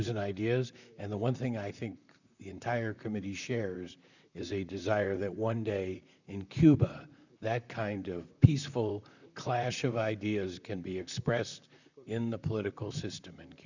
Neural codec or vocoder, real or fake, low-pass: vocoder, 44.1 kHz, 128 mel bands, Pupu-Vocoder; fake; 7.2 kHz